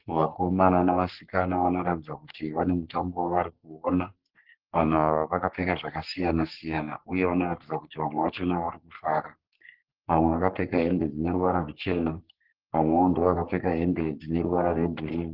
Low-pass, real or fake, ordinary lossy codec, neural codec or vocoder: 5.4 kHz; fake; Opus, 16 kbps; codec, 44.1 kHz, 3.4 kbps, Pupu-Codec